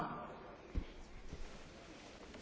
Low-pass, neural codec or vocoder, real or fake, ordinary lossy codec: none; none; real; none